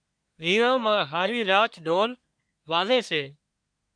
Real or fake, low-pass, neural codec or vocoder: fake; 9.9 kHz; codec, 24 kHz, 1 kbps, SNAC